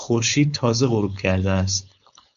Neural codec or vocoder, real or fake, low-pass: codec, 16 kHz, 4.8 kbps, FACodec; fake; 7.2 kHz